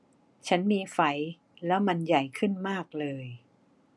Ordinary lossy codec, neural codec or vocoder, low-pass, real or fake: none; none; none; real